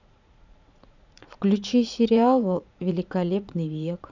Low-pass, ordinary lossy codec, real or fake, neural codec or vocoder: 7.2 kHz; none; fake; vocoder, 44.1 kHz, 80 mel bands, Vocos